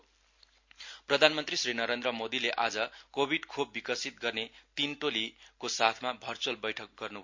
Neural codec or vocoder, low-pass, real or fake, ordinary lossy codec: none; 7.2 kHz; real; MP3, 48 kbps